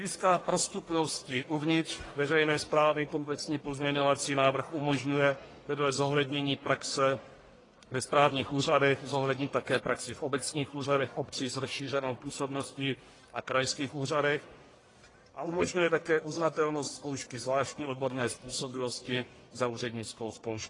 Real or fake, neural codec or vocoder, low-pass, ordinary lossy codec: fake; codec, 44.1 kHz, 1.7 kbps, Pupu-Codec; 10.8 kHz; AAC, 32 kbps